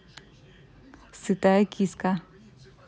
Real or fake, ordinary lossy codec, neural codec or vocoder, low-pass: real; none; none; none